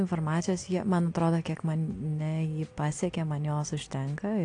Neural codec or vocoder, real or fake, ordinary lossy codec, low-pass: none; real; AAC, 48 kbps; 9.9 kHz